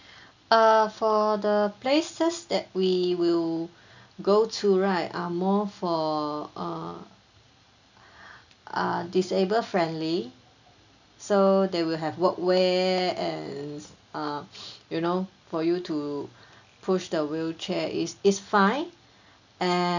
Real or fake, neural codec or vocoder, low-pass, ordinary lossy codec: real; none; 7.2 kHz; none